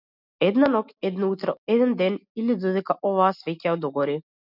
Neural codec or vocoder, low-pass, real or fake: none; 5.4 kHz; real